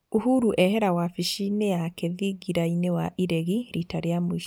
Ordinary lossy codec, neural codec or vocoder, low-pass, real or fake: none; none; none; real